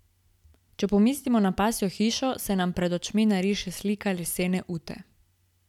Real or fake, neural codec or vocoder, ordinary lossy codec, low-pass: real; none; none; 19.8 kHz